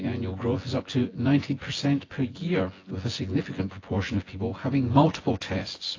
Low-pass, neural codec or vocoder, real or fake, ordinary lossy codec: 7.2 kHz; vocoder, 24 kHz, 100 mel bands, Vocos; fake; AAC, 32 kbps